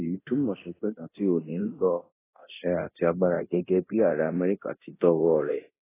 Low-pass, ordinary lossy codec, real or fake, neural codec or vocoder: 3.6 kHz; AAC, 16 kbps; fake; codec, 24 kHz, 0.9 kbps, DualCodec